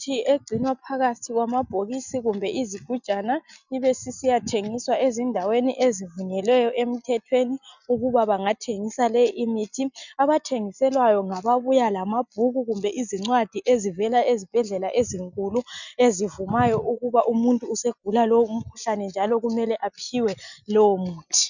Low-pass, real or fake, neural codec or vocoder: 7.2 kHz; real; none